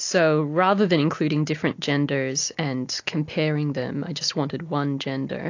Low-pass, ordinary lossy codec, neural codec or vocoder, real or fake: 7.2 kHz; AAC, 48 kbps; none; real